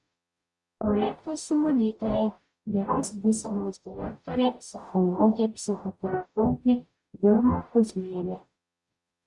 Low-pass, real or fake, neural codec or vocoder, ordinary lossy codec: 10.8 kHz; fake; codec, 44.1 kHz, 0.9 kbps, DAC; Opus, 64 kbps